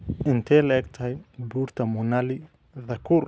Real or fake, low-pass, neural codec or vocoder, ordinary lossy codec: real; none; none; none